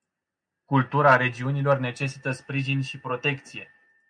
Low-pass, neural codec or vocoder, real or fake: 9.9 kHz; none; real